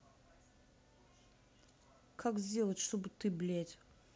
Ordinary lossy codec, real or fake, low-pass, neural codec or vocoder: none; real; none; none